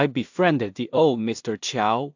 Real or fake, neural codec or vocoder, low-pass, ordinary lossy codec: fake; codec, 16 kHz in and 24 kHz out, 0.4 kbps, LongCat-Audio-Codec, two codebook decoder; 7.2 kHz; AAC, 48 kbps